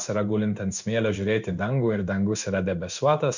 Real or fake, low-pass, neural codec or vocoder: fake; 7.2 kHz; codec, 16 kHz in and 24 kHz out, 1 kbps, XY-Tokenizer